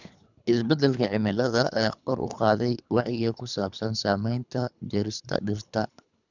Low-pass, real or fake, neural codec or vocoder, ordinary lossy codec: 7.2 kHz; fake; codec, 24 kHz, 3 kbps, HILCodec; none